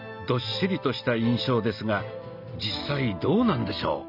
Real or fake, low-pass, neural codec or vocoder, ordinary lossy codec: real; 5.4 kHz; none; none